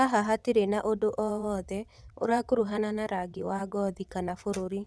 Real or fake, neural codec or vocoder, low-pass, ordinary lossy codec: fake; vocoder, 22.05 kHz, 80 mel bands, WaveNeXt; none; none